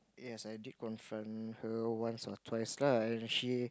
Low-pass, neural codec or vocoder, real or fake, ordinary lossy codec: none; none; real; none